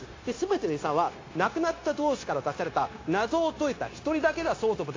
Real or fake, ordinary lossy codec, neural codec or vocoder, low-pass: fake; AAC, 32 kbps; codec, 16 kHz, 0.9 kbps, LongCat-Audio-Codec; 7.2 kHz